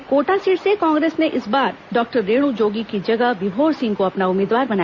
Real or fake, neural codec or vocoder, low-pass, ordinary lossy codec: real; none; 7.2 kHz; Opus, 64 kbps